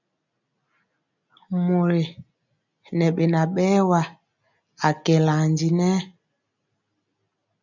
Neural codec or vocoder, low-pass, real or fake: none; 7.2 kHz; real